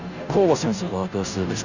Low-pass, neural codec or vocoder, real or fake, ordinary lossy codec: 7.2 kHz; codec, 16 kHz, 0.5 kbps, FunCodec, trained on Chinese and English, 25 frames a second; fake; none